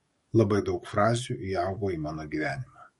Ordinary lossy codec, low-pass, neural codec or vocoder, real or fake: MP3, 48 kbps; 19.8 kHz; codec, 44.1 kHz, 7.8 kbps, DAC; fake